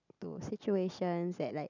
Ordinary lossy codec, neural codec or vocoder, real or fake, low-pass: none; none; real; 7.2 kHz